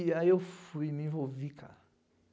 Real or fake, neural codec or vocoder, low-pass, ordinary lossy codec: real; none; none; none